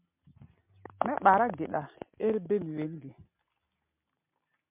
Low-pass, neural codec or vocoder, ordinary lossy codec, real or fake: 3.6 kHz; none; MP3, 32 kbps; real